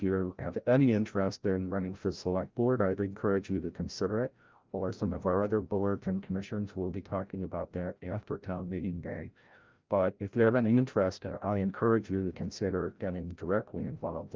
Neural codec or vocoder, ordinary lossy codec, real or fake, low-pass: codec, 16 kHz, 0.5 kbps, FreqCodec, larger model; Opus, 32 kbps; fake; 7.2 kHz